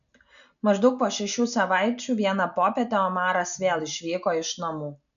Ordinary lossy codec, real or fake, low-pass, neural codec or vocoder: MP3, 96 kbps; real; 7.2 kHz; none